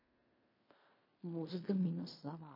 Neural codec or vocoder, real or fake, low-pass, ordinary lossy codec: codec, 16 kHz in and 24 kHz out, 0.4 kbps, LongCat-Audio-Codec, fine tuned four codebook decoder; fake; 5.4 kHz; MP3, 24 kbps